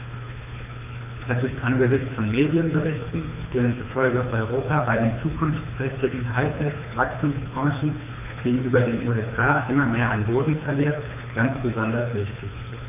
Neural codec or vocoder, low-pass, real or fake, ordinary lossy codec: codec, 24 kHz, 3 kbps, HILCodec; 3.6 kHz; fake; none